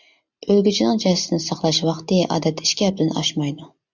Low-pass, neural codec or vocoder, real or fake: 7.2 kHz; none; real